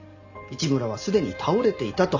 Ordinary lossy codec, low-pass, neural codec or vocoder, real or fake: AAC, 48 kbps; 7.2 kHz; none; real